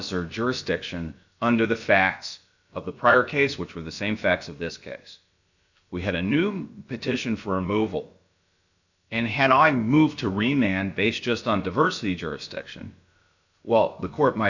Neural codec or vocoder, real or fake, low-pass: codec, 16 kHz, about 1 kbps, DyCAST, with the encoder's durations; fake; 7.2 kHz